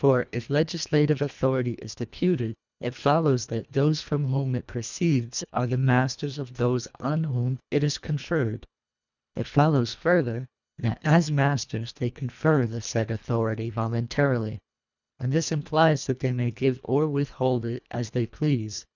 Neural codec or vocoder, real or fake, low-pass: codec, 24 kHz, 1.5 kbps, HILCodec; fake; 7.2 kHz